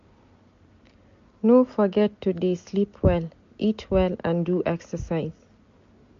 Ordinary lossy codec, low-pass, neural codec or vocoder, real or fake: MP3, 48 kbps; 7.2 kHz; none; real